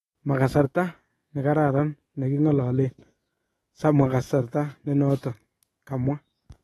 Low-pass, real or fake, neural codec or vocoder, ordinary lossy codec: 19.8 kHz; fake; autoencoder, 48 kHz, 128 numbers a frame, DAC-VAE, trained on Japanese speech; AAC, 32 kbps